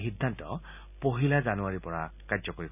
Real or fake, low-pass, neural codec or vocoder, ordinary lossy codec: real; 3.6 kHz; none; none